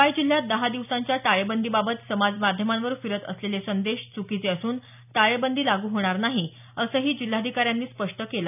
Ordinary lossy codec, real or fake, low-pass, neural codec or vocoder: none; real; 3.6 kHz; none